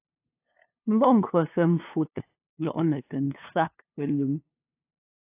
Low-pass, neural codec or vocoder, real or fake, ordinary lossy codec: 3.6 kHz; codec, 16 kHz, 2 kbps, FunCodec, trained on LibriTTS, 25 frames a second; fake; AAC, 24 kbps